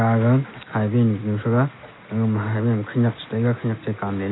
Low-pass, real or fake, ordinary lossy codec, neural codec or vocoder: 7.2 kHz; real; AAC, 16 kbps; none